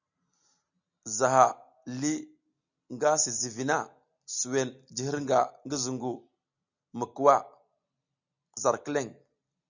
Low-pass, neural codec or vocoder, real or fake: 7.2 kHz; none; real